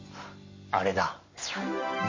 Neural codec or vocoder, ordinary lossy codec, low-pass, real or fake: none; MP3, 32 kbps; 7.2 kHz; real